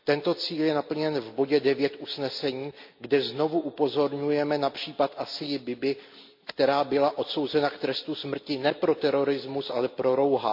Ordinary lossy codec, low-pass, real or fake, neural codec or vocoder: MP3, 48 kbps; 5.4 kHz; real; none